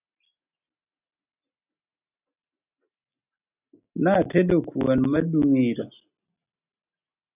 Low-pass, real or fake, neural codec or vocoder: 3.6 kHz; real; none